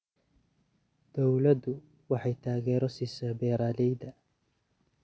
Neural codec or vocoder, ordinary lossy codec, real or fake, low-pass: none; none; real; none